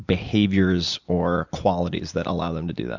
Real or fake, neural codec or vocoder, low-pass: real; none; 7.2 kHz